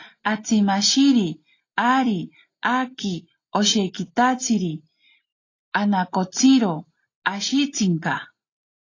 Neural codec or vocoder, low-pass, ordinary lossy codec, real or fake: none; 7.2 kHz; AAC, 32 kbps; real